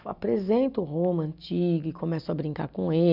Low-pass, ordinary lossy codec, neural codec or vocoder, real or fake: 5.4 kHz; none; none; real